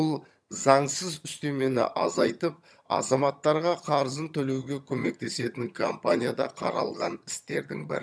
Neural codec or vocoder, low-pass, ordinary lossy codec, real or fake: vocoder, 22.05 kHz, 80 mel bands, HiFi-GAN; none; none; fake